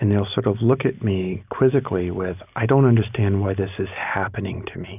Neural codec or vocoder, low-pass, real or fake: none; 3.6 kHz; real